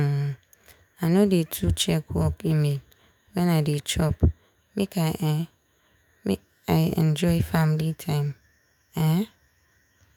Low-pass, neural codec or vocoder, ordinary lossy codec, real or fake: 19.8 kHz; autoencoder, 48 kHz, 128 numbers a frame, DAC-VAE, trained on Japanese speech; none; fake